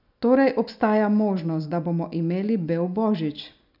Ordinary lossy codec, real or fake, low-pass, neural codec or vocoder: none; real; 5.4 kHz; none